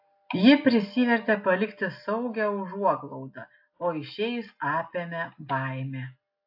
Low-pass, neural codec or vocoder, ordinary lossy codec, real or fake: 5.4 kHz; none; AAC, 48 kbps; real